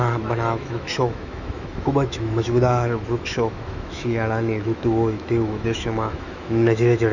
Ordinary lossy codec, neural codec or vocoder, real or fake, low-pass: none; autoencoder, 48 kHz, 128 numbers a frame, DAC-VAE, trained on Japanese speech; fake; 7.2 kHz